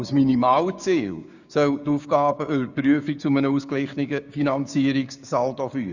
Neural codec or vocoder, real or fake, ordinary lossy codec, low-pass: codec, 16 kHz, 6 kbps, DAC; fake; none; 7.2 kHz